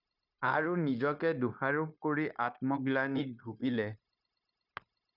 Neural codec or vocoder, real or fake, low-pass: codec, 16 kHz, 0.9 kbps, LongCat-Audio-Codec; fake; 5.4 kHz